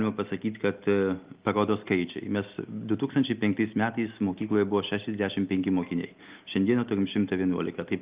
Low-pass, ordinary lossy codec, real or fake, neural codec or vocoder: 3.6 kHz; Opus, 32 kbps; real; none